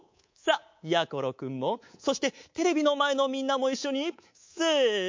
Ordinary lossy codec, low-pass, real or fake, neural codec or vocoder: MP3, 48 kbps; 7.2 kHz; fake; codec, 24 kHz, 3.1 kbps, DualCodec